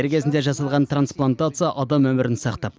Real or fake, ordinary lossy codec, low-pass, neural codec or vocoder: real; none; none; none